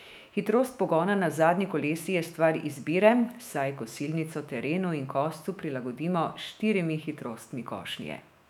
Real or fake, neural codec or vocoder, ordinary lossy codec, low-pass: fake; autoencoder, 48 kHz, 128 numbers a frame, DAC-VAE, trained on Japanese speech; none; 19.8 kHz